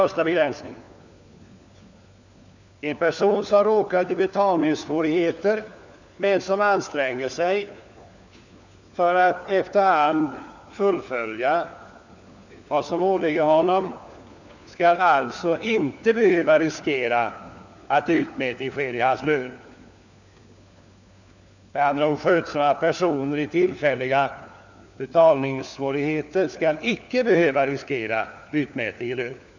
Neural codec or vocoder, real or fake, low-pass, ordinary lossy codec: codec, 16 kHz, 4 kbps, FunCodec, trained on LibriTTS, 50 frames a second; fake; 7.2 kHz; none